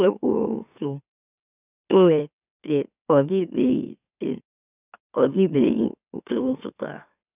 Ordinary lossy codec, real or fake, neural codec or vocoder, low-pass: none; fake; autoencoder, 44.1 kHz, a latent of 192 numbers a frame, MeloTTS; 3.6 kHz